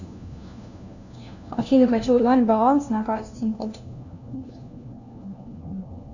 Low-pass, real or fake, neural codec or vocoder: 7.2 kHz; fake; codec, 16 kHz, 1 kbps, FunCodec, trained on LibriTTS, 50 frames a second